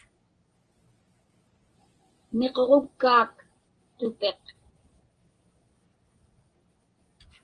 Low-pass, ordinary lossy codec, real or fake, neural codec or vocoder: 9.9 kHz; Opus, 24 kbps; real; none